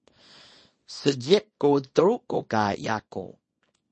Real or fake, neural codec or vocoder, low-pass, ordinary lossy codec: fake; codec, 24 kHz, 0.9 kbps, WavTokenizer, small release; 10.8 kHz; MP3, 32 kbps